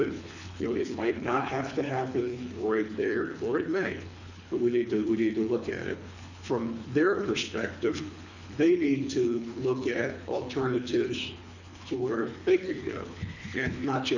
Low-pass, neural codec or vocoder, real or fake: 7.2 kHz; codec, 24 kHz, 3 kbps, HILCodec; fake